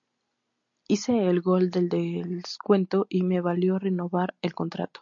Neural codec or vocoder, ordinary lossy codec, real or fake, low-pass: none; MP3, 48 kbps; real; 7.2 kHz